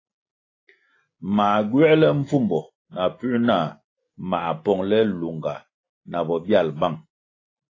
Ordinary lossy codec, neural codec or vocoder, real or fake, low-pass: AAC, 32 kbps; none; real; 7.2 kHz